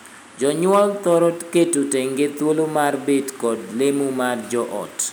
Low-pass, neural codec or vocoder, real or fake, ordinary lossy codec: none; none; real; none